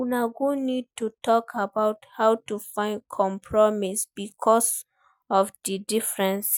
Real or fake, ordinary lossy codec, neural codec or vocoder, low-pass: real; none; none; none